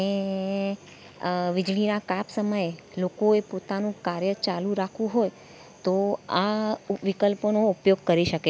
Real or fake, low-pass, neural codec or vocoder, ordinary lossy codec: real; none; none; none